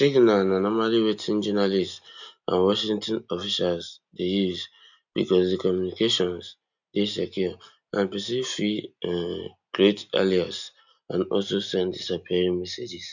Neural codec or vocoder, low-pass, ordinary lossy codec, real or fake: none; 7.2 kHz; none; real